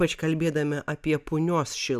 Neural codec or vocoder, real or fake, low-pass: none; real; 14.4 kHz